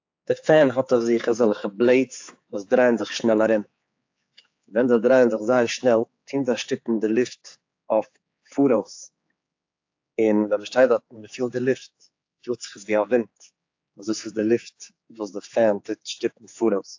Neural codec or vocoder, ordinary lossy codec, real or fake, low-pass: codec, 16 kHz, 4 kbps, X-Codec, HuBERT features, trained on general audio; AAC, 48 kbps; fake; 7.2 kHz